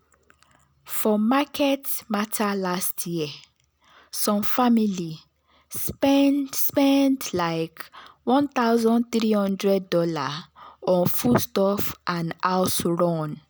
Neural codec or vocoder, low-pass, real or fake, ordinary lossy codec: none; none; real; none